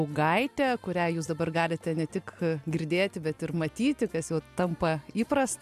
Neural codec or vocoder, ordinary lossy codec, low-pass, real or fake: none; MP3, 96 kbps; 14.4 kHz; real